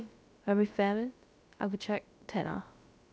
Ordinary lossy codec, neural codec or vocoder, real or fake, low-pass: none; codec, 16 kHz, about 1 kbps, DyCAST, with the encoder's durations; fake; none